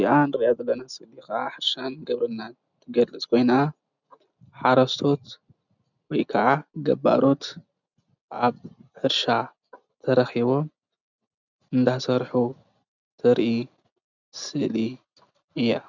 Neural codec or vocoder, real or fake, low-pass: none; real; 7.2 kHz